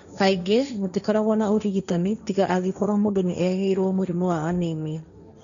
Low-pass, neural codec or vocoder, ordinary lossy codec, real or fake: 7.2 kHz; codec, 16 kHz, 1.1 kbps, Voila-Tokenizer; MP3, 96 kbps; fake